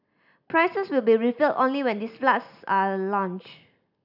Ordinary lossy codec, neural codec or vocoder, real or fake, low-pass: none; none; real; 5.4 kHz